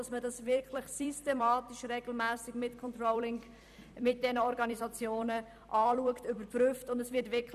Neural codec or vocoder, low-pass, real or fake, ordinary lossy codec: vocoder, 44.1 kHz, 128 mel bands every 256 samples, BigVGAN v2; 14.4 kHz; fake; none